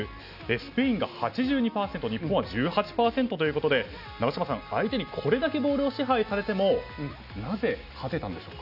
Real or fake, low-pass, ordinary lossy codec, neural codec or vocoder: real; 5.4 kHz; none; none